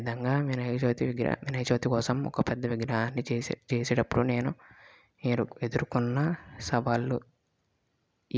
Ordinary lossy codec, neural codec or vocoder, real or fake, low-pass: none; none; real; none